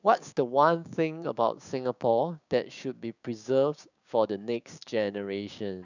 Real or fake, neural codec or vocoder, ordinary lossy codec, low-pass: fake; codec, 16 kHz, 6 kbps, DAC; none; 7.2 kHz